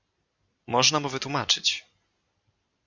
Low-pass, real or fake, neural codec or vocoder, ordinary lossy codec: 7.2 kHz; real; none; Opus, 64 kbps